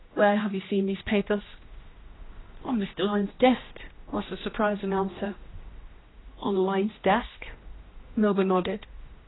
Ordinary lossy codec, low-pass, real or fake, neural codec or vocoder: AAC, 16 kbps; 7.2 kHz; fake; codec, 16 kHz, 1 kbps, X-Codec, HuBERT features, trained on general audio